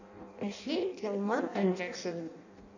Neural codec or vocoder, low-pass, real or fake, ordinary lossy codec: codec, 16 kHz in and 24 kHz out, 0.6 kbps, FireRedTTS-2 codec; 7.2 kHz; fake; none